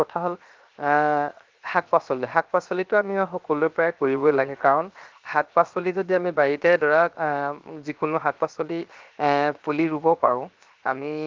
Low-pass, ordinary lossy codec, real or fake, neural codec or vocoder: 7.2 kHz; Opus, 32 kbps; fake; codec, 16 kHz, 0.7 kbps, FocalCodec